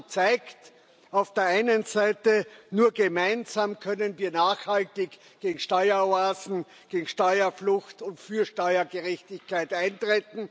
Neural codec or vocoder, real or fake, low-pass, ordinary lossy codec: none; real; none; none